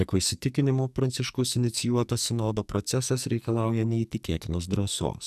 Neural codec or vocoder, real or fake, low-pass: codec, 44.1 kHz, 2.6 kbps, SNAC; fake; 14.4 kHz